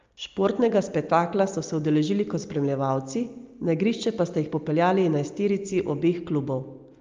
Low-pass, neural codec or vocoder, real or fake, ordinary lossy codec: 7.2 kHz; none; real; Opus, 32 kbps